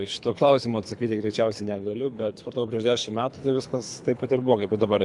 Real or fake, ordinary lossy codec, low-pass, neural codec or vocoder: fake; AAC, 64 kbps; 10.8 kHz; codec, 24 kHz, 3 kbps, HILCodec